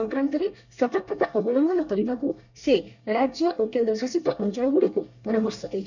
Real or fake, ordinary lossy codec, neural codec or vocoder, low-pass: fake; none; codec, 24 kHz, 1 kbps, SNAC; 7.2 kHz